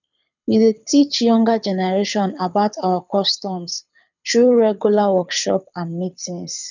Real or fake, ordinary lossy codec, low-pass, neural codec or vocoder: fake; none; 7.2 kHz; codec, 24 kHz, 6 kbps, HILCodec